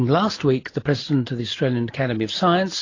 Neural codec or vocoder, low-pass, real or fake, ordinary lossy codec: none; 7.2 kHz; real; AAC, 32 kbps